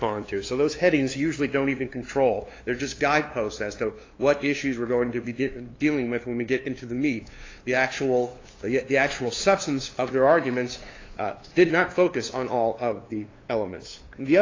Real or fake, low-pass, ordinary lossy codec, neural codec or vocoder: fake; 7.2 kHz; AAC, 32 kbps; codec, 16 kHz, 2 kbps, FunCodec, trained on LibriTTS, 25 frames a second